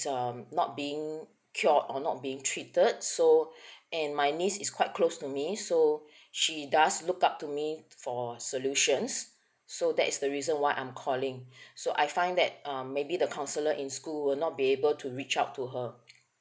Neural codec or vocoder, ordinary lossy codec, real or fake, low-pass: none; none; real; none